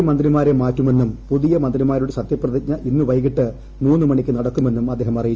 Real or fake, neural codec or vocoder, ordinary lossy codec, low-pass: real; none; Opus, 24 kbps; 7.2 kHz